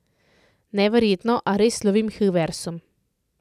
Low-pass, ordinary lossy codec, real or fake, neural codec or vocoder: 14.4 kHz; none; real; none